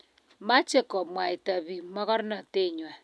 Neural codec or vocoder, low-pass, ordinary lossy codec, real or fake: none; none; none; real